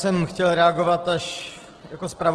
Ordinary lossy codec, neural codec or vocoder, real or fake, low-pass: Opus, 16 kbps; none; real; 10.8 kHz